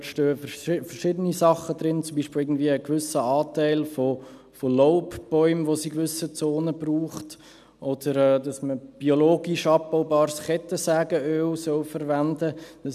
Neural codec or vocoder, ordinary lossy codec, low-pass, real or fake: none; none; 14.4 kHz; real